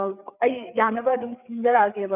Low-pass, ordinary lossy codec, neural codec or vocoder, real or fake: 3.6 kHz; none; codec, 16 kHz, 4 kbps, FreqCodec, larger model; fake